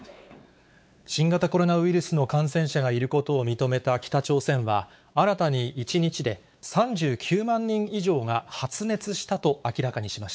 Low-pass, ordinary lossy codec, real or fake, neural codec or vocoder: none; none; fake; codec, 16 kHz, 4 kbps, X-Codec, WavLM features, trained on Multilingual LibriSpeech